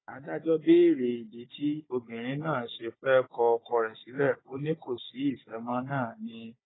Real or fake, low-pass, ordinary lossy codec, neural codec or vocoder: fake; 7.2 kHz; AAC, 16 kbps; codec, 16 kHz, 16 kbps, FunCodec, trained on Chinese and English, 50 frames a second